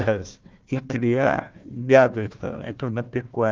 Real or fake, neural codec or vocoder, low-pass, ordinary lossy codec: fake; codec, 16 kHz, 1 kbps, FunCodec, trained on Chinese and English, 50 frames a second; 7.2 kHz; Opus, 32 kbps